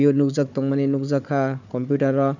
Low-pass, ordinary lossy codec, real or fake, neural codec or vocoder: 7.2 kHz; none; fake; codec, 16 kHz, 4 kbps, FunCodec, trained on Chinese and English, 50 frames a second